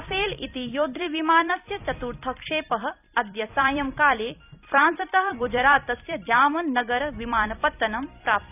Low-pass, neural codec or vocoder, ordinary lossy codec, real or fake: 3.6 kHz; vocoder, 44.1 kHz, 128 mel bands every 256 samples, BigVGAN v2; none; fake